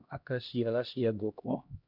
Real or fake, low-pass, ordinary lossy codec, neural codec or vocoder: fake; 5.4 kHz; none; codec, 16 kHz, 1 kbps, X-Codec, HuBERT features, trained on LibriSpeech